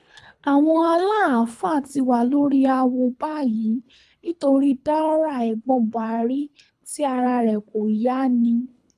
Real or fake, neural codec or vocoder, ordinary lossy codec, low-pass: fake; codec, 24 kHz, 3 kbps, HILCodec; none; none